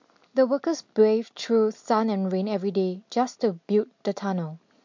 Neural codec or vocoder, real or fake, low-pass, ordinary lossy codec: none; real; 7.2 kHz; MP3, 48 kbps